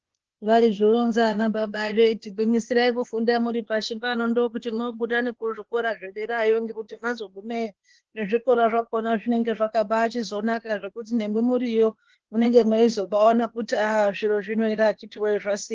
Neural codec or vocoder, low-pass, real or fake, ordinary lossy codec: codec, 16 kHz, 0.8 kbps, ZipCodec; 7.2 kHz; fake; Opus, 16 kbps